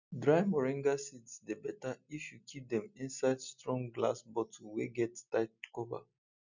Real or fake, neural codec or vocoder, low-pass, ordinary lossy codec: real; none; 7.2 kHz; none